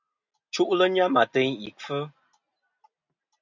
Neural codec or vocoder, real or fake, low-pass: none; real; 7.2 kHz